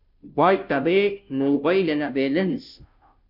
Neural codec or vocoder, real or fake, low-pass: codec, 16 kHz, 0.5 kbps, FunCodec, trained on Chinese and English, 25 frames a second; fake; 5.4 kHz